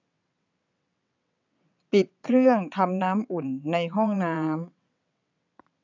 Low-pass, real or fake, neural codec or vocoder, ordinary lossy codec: 7.2 kHz; fake; vocoder, 22.05 kHz, 80 mel bands, WaveNeXt; none